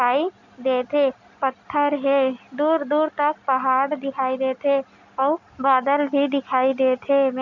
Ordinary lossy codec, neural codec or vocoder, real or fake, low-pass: none; none; real; 7.2 kHz